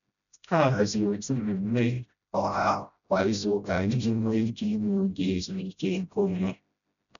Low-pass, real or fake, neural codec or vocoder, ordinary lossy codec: 7.2 kHz; fake; codec, 16 kHz, 0.5 kbps, FreqCodec, smaller model; none